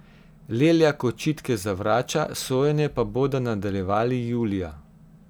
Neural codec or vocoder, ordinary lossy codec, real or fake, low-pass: none; none; real; none